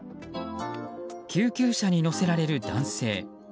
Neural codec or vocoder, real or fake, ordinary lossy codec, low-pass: none; real; none; none